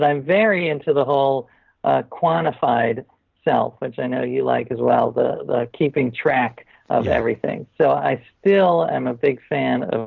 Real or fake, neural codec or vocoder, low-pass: real; none; 7.2 kHz